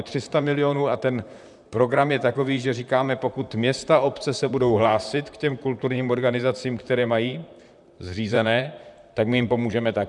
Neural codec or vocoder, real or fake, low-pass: vocoder, 44.1 kHz, 128 mel bands, Pupu-Vocoder; fake; 10.8 kHz